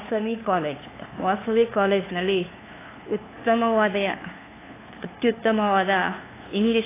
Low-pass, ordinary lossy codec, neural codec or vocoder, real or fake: 3.6 kHz; AAC, 16 kbps; codec, 16 kHz, 2 kbps, FunCodec, trained on LibriTTS, 25 frames a second; fake